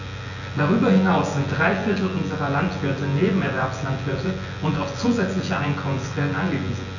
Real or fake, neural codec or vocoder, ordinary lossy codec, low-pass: fake; vocoder, 24 kHz, 100 mel bands, Vocos; none; 7.2 kHz